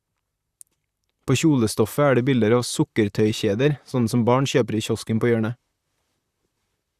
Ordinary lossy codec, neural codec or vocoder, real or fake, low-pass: Opus, 64 kbps; vocoder, 44.1 kHz, 128 mel bands, Pupu-Vocoder; fake; 14.4 kHz